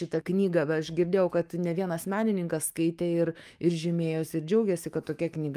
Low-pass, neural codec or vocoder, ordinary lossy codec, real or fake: 14.4 kHz; autoencoder, 48 kHz, 128 numbers a frame, DAC-VAE, trained on Japanese speech; Opus, 32 kbps; fake